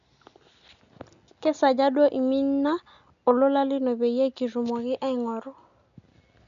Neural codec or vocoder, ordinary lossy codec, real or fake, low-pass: none; none; real; 7.2 kHz